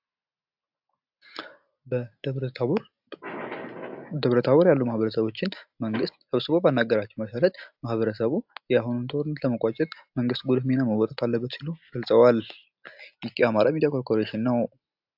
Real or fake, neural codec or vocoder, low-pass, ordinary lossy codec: real; none; 5.4 kHz; AAC, 48 kbps